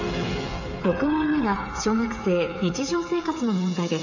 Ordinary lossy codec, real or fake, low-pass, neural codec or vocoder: none; fake; 7.2 kHz; codec, 16 kHz, 8 kbps, FreqCodec, smaller model